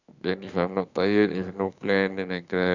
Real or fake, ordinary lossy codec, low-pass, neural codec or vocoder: fake; none; 7.2 kHz; autoencoder, 48 kHz, 32 numbers a frame, DAC-VAE, trained on Japanese speech